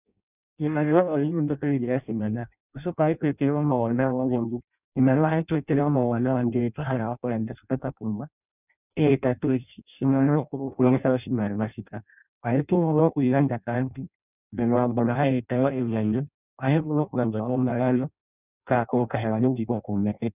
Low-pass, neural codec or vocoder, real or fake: 3.6 kHz; codec, 16 kHz in and 24 kHz out, 0.6 kbps, FireRedTTS-2 codec; fake